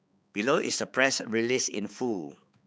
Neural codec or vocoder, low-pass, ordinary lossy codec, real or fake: codec, 16 kHz, 4 kbps, X-Codec, HuBERT features, trained on balanced general audio; none; none; fake